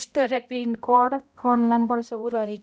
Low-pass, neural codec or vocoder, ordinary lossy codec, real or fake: none; codec, 16 kHz, 0.5 kbps, X-Codec, HuBERT features, trained on balanced general audio; none; fake